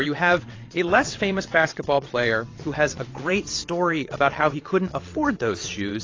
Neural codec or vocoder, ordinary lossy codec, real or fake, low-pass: vocoder, 22.05 kHz, 80 mel bands, WaveNeXt; AAC, 32 kbps; fake; 7.2 kHz